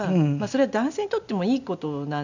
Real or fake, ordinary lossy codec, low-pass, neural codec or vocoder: real; none; 7.2 kHz; none